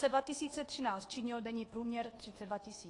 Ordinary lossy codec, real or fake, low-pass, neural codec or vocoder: AAC, 32 kbps; fake; 10.8 kHz; codec, 24 kHz, 1.2 kbps, DualCodec